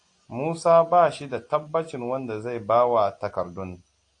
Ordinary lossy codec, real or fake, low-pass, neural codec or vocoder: AAC, 48 kbps; real; 9.9 kHz; none